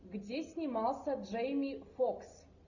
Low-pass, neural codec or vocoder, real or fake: 7.2 kHz; none; real